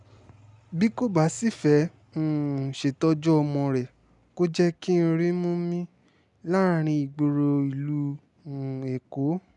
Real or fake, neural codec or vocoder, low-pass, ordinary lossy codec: real; none; 10.8 kHz; none